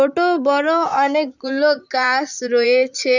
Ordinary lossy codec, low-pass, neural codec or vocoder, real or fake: none; 7.2 kHz; vocoder, 44.1 kHz, 128 mel bands, Pupu-Vocoder; fake